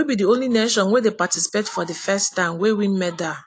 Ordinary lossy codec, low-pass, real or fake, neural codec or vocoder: AAC, 48 kbps; 9.9 kHz; real; none